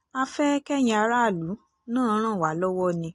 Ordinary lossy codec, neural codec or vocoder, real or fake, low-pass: AAC, 48 kbps; none; real; 10.8 kHz